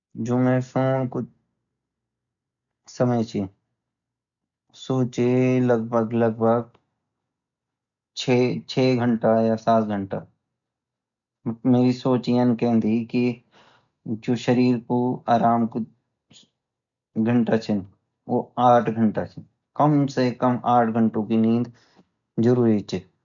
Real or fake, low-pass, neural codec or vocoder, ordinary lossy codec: real; 7.2 kHz; none; none